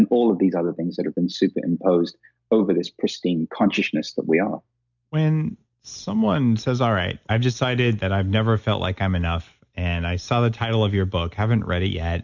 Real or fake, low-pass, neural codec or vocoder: real; 7.2 kHz; none